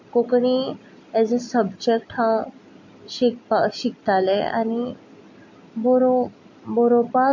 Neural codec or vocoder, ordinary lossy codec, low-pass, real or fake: none; MP3, 48 kbps; 7.2 kHz; real